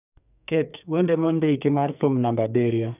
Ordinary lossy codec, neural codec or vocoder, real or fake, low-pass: none; codec, 44.1 kHz, 2.6 kbps, SNAC; fake; 3.6 kHz